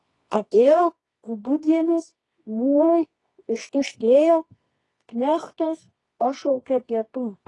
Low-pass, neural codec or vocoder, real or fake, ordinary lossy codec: 10.8 kHz; codec, 24 kHz, 0.9 kbps, WavTokenizer, medium music audio release; fake; AAC, 32 kbps